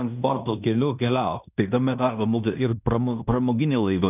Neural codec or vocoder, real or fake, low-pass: codec, 16 kHz in and 24 kHz out, 0.9 kbps, LongCat-Audio-Codec, fine tuned four codebook decoder; fake; 3.6 kHz